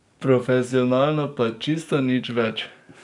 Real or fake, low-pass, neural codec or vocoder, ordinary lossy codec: fake; 10.8 kHz; codec, 44.1 kHz, 7.8 kbps, DAC; none